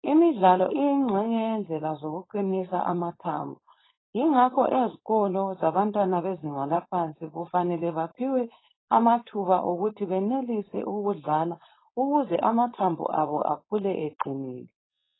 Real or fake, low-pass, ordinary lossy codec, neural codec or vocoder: fake; 7.2 kHz; AAC, 16 kbps; codec, 16 kHz, 4.8 kbps, FACodec